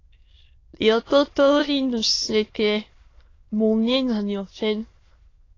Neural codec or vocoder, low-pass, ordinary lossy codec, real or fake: autoencoder, 22.05 kHz, a latent of 192 numbers a frame, VITS, trained on many speakers; 7.2 kHz; AAC, 32 kbps; fake